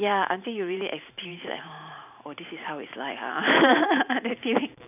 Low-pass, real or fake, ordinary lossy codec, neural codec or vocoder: 3.6 kHz; fake; none; vocoder, 44.1 kHz, 128 mel bands every 512 samples, BigVGAN v2